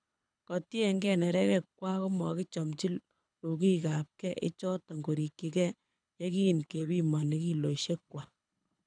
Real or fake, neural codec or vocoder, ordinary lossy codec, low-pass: fake; codec, 24 kHz, 6 kbps, HILCodec; none; 9.9 kHz